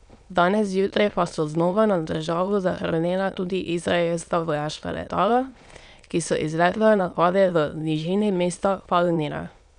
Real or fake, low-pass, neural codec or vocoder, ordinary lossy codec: fake; 9.9 kHz; autoencoder, 22.05 kHz, a latent of 192 numbers a frame, VITS, trained on many speakers; none